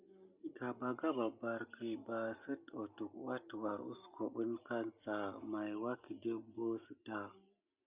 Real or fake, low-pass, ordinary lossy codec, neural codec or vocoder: real; 3.6 kHz; Opus, 64 kbps; none